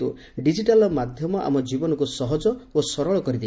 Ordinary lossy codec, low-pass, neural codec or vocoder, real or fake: none; none; none; real